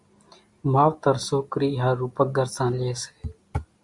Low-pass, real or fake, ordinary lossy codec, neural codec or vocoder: 10.8 kHz; real; Opus, 64 kbps; none